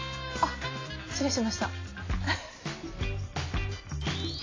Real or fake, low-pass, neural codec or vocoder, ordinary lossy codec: real; 7.2 kHz; none; AAC, 32 kbps